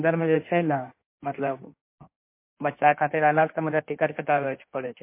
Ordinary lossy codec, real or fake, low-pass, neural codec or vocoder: MP3, 24 kbps; fake; 3.6 kHz; codec, 16 kHz in and 24 kHz out, 1.1 kbps, FireRedTTS-2 codec